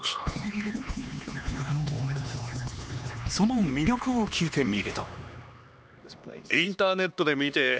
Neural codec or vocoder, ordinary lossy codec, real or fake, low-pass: codec, 16 kHz, 2 kbps, X-Codec, HuBERT features, trained on LibriSpeech; none; fake; none